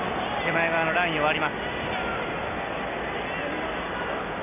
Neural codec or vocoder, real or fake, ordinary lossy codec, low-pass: none; real; none; 3.6 kHz